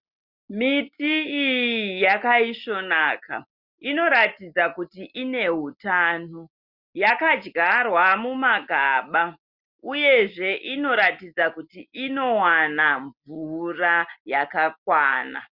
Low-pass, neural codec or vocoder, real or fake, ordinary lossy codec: 5.4 kHz; none; real; Opus, 64 kbps